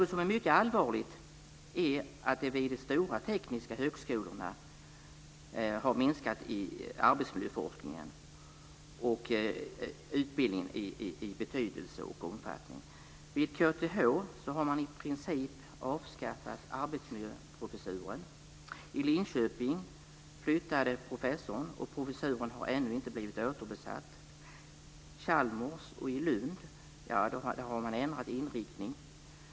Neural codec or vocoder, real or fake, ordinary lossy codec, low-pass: none; real; none; none